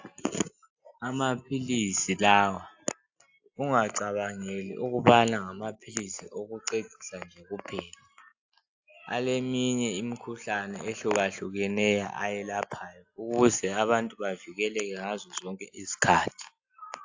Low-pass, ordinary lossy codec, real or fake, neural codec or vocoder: 7.2 kHz; AAC, 48 kbps; real; none